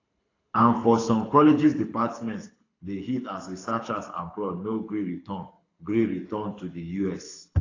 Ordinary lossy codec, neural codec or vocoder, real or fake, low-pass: AAC, 32 kbps; codec, 24 kHz, 6 kbps, HILCodec; fake; 7.2 kHz